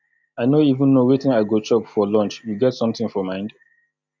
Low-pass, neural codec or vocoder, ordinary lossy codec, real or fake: 7.2 kHz; none; none; real